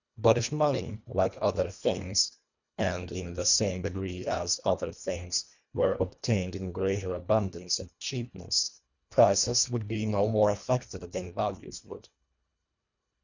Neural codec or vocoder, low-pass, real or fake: codec, 24 kHz, 1.5 kbps, HILCodec; 7.2 kHz; fake